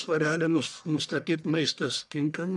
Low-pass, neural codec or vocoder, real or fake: 10.8 kHz; codec, 44.1 kHz, 1.7 kbps, Pupu-Codec; fake